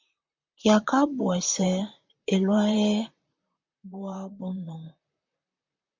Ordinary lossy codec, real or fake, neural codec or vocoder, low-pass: MP3, 64 kbps; fake; vocoder, 44.1 kHz, 128 mel bands, Pupu-Vocoder; 7.2 kHz